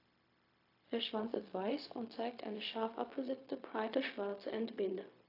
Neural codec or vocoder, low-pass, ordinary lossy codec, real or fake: codec, 16 kHz, 0.4 kbps, LongCat-Audio-Codec; 5.4 kHz; AAC, 32 kbps; fake